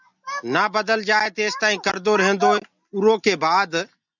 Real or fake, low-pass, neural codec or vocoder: real; 7.2 kHz; none